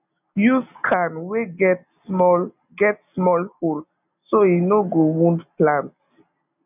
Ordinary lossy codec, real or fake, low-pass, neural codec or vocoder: none; real; 3.6 kHz; none